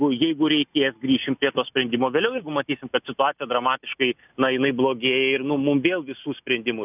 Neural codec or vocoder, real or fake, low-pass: none; real; 3.6 kHz